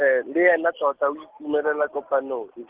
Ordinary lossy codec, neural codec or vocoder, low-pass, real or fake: Opus, 16 kbps; none; 3.6 kHz; real